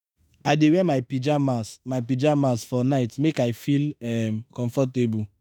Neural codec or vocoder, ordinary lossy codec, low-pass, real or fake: autoencoder, 48 kHz, 32 numbers a frame, DAC-VAE, trained on Japanese speech; none; none; fake